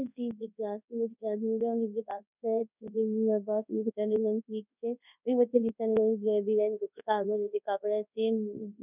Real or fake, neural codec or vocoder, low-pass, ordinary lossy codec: fake; codec, 24 kHz, 0.9 kbps, WavTokenizer, large speech release; 3.6 kHz; none